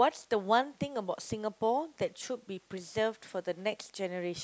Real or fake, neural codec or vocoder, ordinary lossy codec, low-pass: real; none; none; none